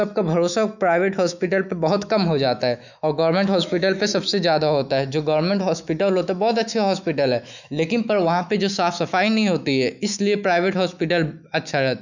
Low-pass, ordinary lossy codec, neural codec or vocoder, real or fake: 7.2 kHz; none; autoencoder, 48 kHz, 128 numbers a frame, DAC-VAE, trained on Japanese speech; fake